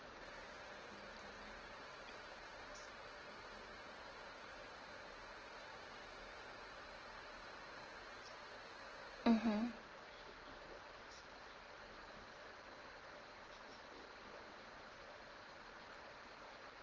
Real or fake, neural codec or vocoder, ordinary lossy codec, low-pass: real; none; Opus, 16 kbps; 7.2 kHz